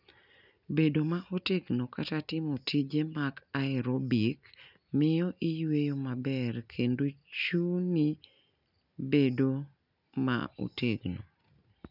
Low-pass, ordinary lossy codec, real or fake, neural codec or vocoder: 5.4 kHz; none; real; none